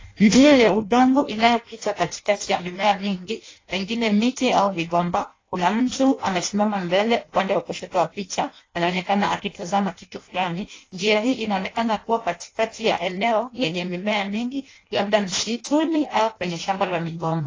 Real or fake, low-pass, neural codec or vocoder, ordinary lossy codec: fake; 7.2 kHz; codec, 16 kHz in and 24 kHz out, 0.6 kbps, FireRedTTS-2 codec; AAC, 32 kbps